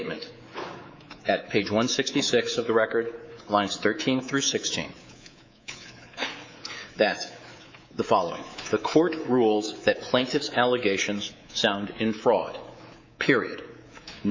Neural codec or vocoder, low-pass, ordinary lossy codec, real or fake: codec, 24 kHz, 3.1 kbps, DualCodec; 7.2 kHz; MP3, 48 kbps; fake